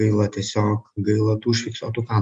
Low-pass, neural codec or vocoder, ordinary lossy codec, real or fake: 9.9 kHz; none; MP3, 64 kbps; real